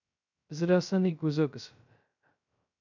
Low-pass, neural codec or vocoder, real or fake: 7.2 kHz; codec, 16 kHz, 0.2 kbps, FocalCodec; fake